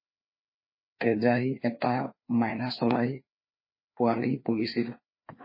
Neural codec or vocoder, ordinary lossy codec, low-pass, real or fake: codec, 16 kHz, 2 kbps, FreqCodec, larger model; MP3, 24 kbps; 5.4 kHz; fake